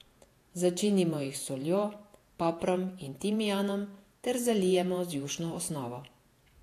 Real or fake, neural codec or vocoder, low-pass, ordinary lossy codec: fake; vocoder, 48 kHz, 128 mel bands, Vocos; 14.4 kHz; AAC, 64 kbps